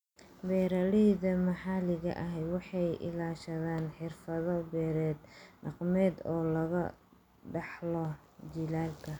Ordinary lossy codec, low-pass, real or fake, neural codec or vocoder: none; 19.8 kHz; real; none